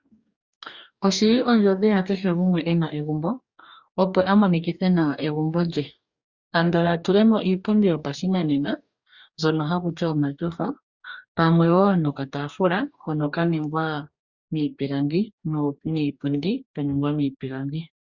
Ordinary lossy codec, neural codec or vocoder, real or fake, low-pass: Opus, 64 kbps; codec, 44.1 kHz, 2.6 kbps, DAC; fake; 7.2 kHz